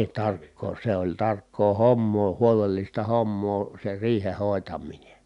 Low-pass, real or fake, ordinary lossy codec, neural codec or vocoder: 10.8 kHz; real; none; none